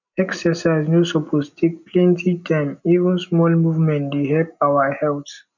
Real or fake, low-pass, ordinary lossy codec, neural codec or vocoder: real; 7.2 kHz; none; none